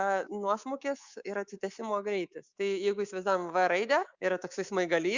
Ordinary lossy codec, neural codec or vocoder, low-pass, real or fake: Opus, 64 kbps; codec, 24 kHz, 3.1 kbps, DualCodec; 7.2 kHz; fake